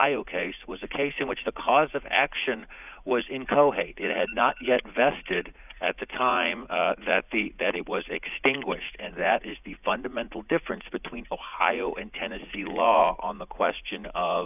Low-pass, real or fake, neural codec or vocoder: 3.6 kHz; fake; vocoder, 44.1 kHz, 80 mel bands, Vocos